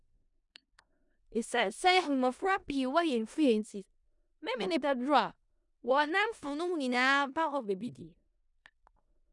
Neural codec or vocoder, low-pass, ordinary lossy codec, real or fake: codec, 16 kHz in and 24 kHz out, 0.4 kbps, LongCat-Audio-Codec, four codebook decoder; 10.8 kHz; none; fake